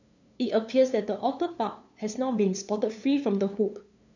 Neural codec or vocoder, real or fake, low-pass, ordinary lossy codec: codec, 16 kHz, 2 kbps, FunCodec, trained on LibriTTS, 25 frames a second; fake; 7.2 kHz; none